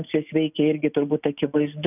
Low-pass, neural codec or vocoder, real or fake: 3.6 kHz; none; real